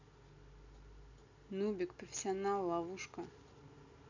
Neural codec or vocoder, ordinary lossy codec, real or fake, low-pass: none; none; real; 7.2 kHz